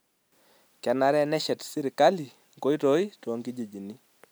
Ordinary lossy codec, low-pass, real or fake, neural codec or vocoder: none; none; real; none